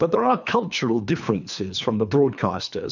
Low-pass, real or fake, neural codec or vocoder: 7.2 kHz; fake; codec, 24 kHz, 3 kbps, HILCodec